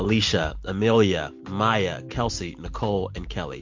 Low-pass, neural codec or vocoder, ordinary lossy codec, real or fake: 7.2 kHz; none; MP3, 48 kbps; real